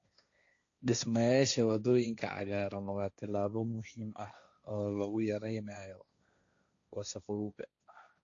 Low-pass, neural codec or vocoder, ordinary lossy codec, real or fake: 7.2 kHz; codec, 16 kHz, 1.1 kbps, Voila-Tokenizer; AAC, 48 kbps; fake